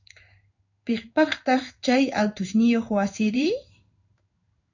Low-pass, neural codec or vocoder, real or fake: 7.2 kHz; codec, 16 kHz in and 24 kHz out, 1 kbps, XY-Tokenizer; fake